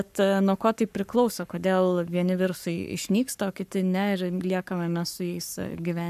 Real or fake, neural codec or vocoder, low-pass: fake; codec, 44.1 kHz, 7.8 kbps, Pupu-Codec; 14.4 kHz